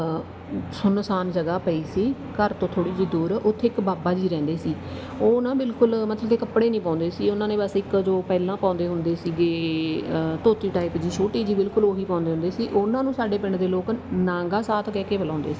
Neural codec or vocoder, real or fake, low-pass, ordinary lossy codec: none; real; none; none